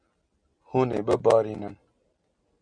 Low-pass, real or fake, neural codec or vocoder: 9.9 kHz; real; none